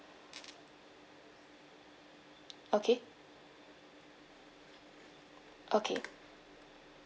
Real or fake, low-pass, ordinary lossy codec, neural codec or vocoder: real; none; none; none